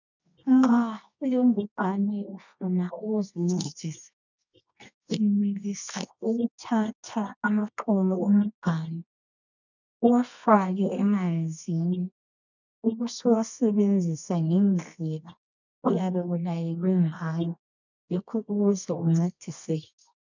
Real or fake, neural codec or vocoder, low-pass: fake; codec, 24 kHz, 0.9 kbps, WavTokenizer, medium music audio release; 7.2 kHz